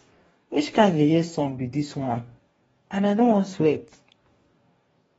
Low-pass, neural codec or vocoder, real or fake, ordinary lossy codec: 19.8 kHz; codec, 44.1 kHz, 2.6 kbps, DAC; fake; AAC, 24 kbps